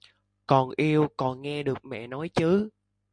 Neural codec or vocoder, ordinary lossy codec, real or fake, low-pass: none; MP3, 64 kbps; real; 9.9 kHz